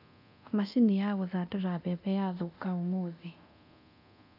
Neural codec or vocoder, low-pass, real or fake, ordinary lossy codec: codec, 24 kHz, 0.9 kbps, DualCodec; 5.4 kHz; fake; none